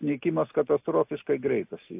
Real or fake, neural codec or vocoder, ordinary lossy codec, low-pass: real; none; AAC, 32 kbps; 3.6 kHz